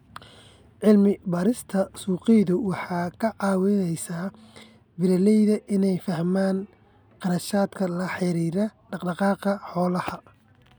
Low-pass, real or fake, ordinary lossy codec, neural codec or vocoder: none; real; none; none